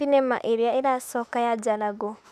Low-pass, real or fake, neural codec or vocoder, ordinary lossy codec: 14.4 kHz; fake; autoencoder, 48 kHz, 32 numbers a frame, DAC-VAE, trained on Japanese speech; none